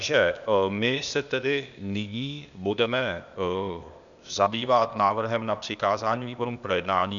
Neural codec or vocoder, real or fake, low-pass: codec, 16 kHz, 0.8 kbps, ZipCodec; fake; 7.2 kHz